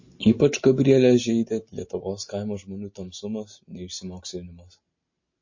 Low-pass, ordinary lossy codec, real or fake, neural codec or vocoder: 7.2 kHz; MP3, 32 kbps; real; none